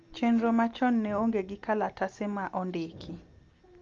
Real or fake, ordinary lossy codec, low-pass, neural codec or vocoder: real; Opus, 32 kbps; 7.2 kHz; none